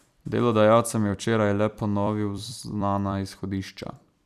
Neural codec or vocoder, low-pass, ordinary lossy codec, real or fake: vocoder, 44.1 kHz, 128 mel bands every 256 samples, BigVGAN v2; 14.4 kHz; none; fake